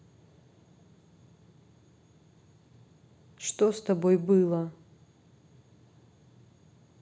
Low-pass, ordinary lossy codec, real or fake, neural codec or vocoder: none; none; real; none